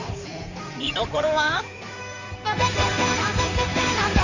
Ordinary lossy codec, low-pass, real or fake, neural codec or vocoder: none; 7.2 kHz; fake; codec, 16 kHz in and 24 kHz out, 2.2 kbps, FireRedTTS-2 codec